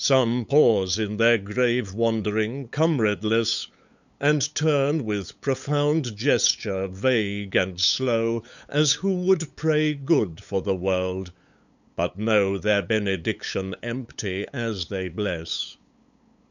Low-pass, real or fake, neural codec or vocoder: 7.2 kHz; fake; codec, 16 kHz, 8 kbps, FunCodec, trained on LibriTTS, 25 frames a second